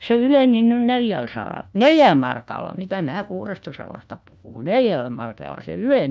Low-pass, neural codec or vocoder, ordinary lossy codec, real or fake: none; codec, 16 kHz, 1 kbps, FunCodec, trained on LibriTTS, 50 frames a second; none; fake